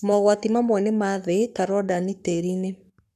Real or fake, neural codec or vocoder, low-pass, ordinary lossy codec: fake; codec, 44.1 kHz, 7.8 kbps, Pupu-Codec; 14.4 kHz; none